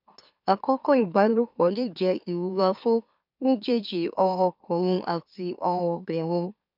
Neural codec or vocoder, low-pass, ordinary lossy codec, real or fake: autoencoder, 44.1 kHz, a latent of 192 numbers a frame, MeloTTS; 5.4 kHz; none; fake